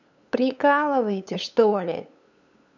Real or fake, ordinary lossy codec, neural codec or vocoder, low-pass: fake; none; codec, 16 kHz, 8 kbps, FunCodec, trained on LibriTTS, 25 frames a second; 7.2 kHz